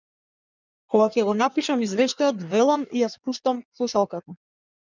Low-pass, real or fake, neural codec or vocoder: 7.2 kHz; fake; codec, 16 kHz in and 24 kHz out, 1.1 kbps, FireRedTTS-2 codec